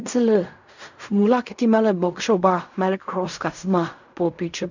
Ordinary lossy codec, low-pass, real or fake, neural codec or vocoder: none; 7.2 kHz; fake; codec, 16 kHz in and 24 kHz out, 0.4 kbps, LongCat-Audio-Codec, fine tuned four codebook decoder